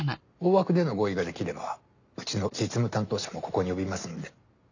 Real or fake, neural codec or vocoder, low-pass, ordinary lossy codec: real; none; 7.2 kHz; none